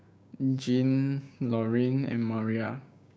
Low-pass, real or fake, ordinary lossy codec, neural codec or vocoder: none; fake; none; codec, 16 kHz, 6 kbps, DAC